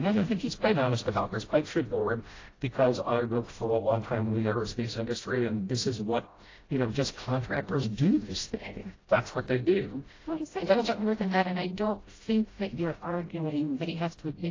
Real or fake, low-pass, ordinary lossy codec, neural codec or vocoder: fake; 7.2 kHz; AAC, 32 kbps; codec, 16 kHz, 0.5 kbps, FreqCodec, smaller model